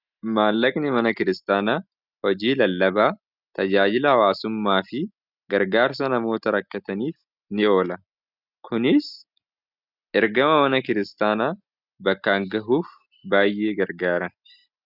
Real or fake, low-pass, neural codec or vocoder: real; 5.4 kHz; none